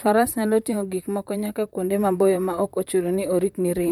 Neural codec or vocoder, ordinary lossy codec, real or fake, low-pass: vocoder, 44.1 kHz, 128 mel bands, Pupu-Vocoder; MP3, 96 kbps; fake; 19.8 kHz